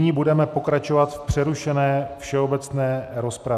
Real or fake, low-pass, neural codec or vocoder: real; 14.4 kHz; none